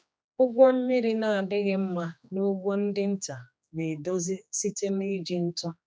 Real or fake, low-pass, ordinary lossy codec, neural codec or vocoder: fake; none; none; codec, 16 kHz, 2 kbps, X-Codec, HuBERT features, trained on general audio